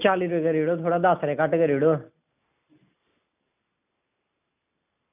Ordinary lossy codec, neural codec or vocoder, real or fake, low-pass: none; none; real; 3.6 kHz